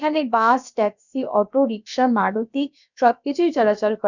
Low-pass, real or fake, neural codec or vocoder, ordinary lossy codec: 7.2 kHz; fake; codec, 16 kHz, about 1 kbps, DyCAST, with the encoder's durations; none